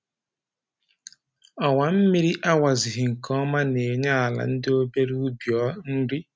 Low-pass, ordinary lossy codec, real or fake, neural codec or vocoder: none; none; real; none